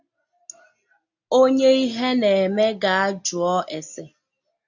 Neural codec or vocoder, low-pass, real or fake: none; 7.2 kHz; real